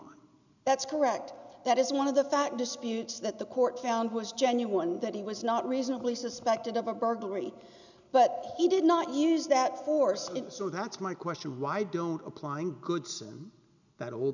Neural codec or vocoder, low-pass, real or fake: none; 7.2 kHz; real